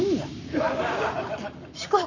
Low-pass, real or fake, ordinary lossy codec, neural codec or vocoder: 7.2 kHz; fake; none; codec, 44.1 kHz, 7.8 kbps, Pupu-Codec